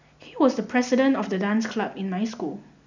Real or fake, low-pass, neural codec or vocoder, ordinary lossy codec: real; 7.2 kHz; none; none